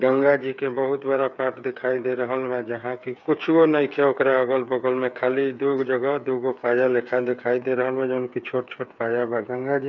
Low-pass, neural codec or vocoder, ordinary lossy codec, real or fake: 7.2 kHz; codec, 16 kHz, 8 kbps, FreqCodec, smaller model; none; fake